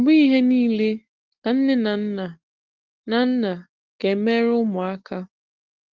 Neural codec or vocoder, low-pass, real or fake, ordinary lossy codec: none; 7.2 kHz; real; Opus, 16 kbps